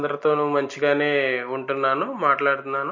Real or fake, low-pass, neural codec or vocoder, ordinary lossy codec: real; 7.2 kHz; none; MP3, 32 kbps